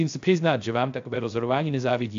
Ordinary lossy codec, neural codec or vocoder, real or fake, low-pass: AAC, 64 kbps; codec, 16 kHz, 0.3 kbps, FocalCodec; fake; 7.2 kHz